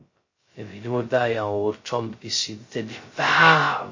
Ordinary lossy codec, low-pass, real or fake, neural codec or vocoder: MP3, 32 kbps; 7.2 kHz; fake; codec, 16 kHz, 0.2 kbps, FocalCodec